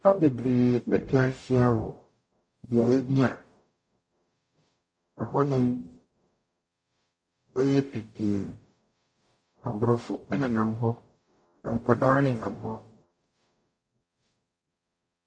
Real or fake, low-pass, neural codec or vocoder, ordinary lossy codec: fake; 9.9 kHz; codec, 44.1 kHz, 0.9 kbps, DAC; MP3, 64 kbps